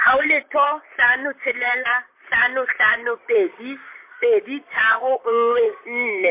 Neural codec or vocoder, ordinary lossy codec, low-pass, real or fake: vocoder, 44.1 kHz, 128 mel bands, Pupu-Vocoder; MP3, 24 kbps; 3.6 kHz; fake